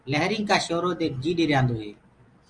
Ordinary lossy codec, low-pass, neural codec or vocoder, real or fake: Opus, 32 kbps; 9.9 kHz; none; real